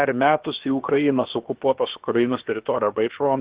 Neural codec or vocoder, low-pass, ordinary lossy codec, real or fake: codec, 16 kHz, about 1 kbps, DyCAST, with the encoder's durations; 3.6 kHz; Opus, 16 kbps; fake